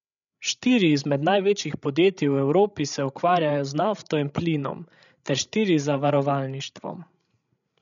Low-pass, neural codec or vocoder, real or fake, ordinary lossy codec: 7.2 kHz; codec, 16 kHz, 16 kbps, FreqCodec, larger model; fake; none